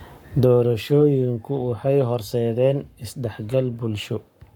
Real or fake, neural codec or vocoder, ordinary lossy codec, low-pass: fake; codec, 44.1 kHz, 7.8 kbps, Pupu-Codec; none; 19.8 kHz